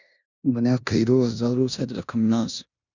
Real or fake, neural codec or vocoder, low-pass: fake; codec, 16 kHz in and 24 kHz out, 0.9 kbps, LongCat-Audio-Codec, four codebook decoder; 7.2 kHz